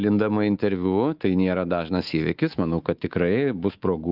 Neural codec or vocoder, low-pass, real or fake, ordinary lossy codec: codec, 24 kHz, 3.1 kbps, DualCodec; 5.4 kHz; fake; Opus, 32 kbps